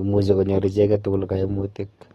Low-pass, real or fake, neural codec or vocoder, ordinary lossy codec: 19.8 kHz; fake; codec, 44.1 kHz, 7.8 kbps, DAC; AAC, 32 kbps